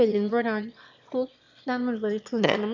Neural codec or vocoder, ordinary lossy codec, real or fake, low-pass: autoencoder, 22.05 kHz, a latent of 192 numbers a frame, VITS, trained on one speaker; none; fake; 7.2 kHz